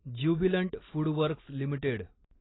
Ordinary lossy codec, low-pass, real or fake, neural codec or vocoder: AAC, 16 kbps; 7.2 kHz; real; none